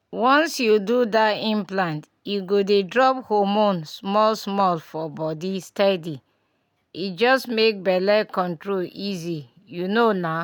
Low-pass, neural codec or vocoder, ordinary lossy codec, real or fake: 19.8 kHz; none; none; real